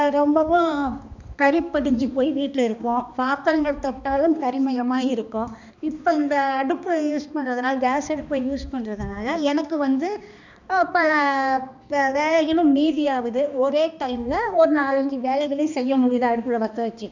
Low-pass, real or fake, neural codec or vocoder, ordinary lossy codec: 7.2 kHz; fake; codec, 16 kHz, 2 kbps, X-Codec, HuBERT features, trained on balanced general audio; none